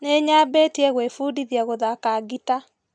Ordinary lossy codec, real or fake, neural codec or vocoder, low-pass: none; real; none; 9.9 kHz